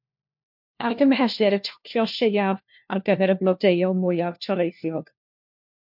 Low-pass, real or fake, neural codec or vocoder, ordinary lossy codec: 5.4 kHz; fake; codec, 16 kHz, 1 kbps, FunCodec, trained on LibriTTS, 50 frames a second; MP3, 48 kbps